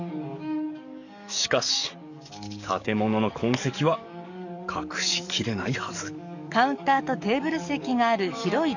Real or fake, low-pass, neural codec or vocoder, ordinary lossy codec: fake; 7.2 kHz; codec, 44.1 kHz, 7.8 kbps, DAC; none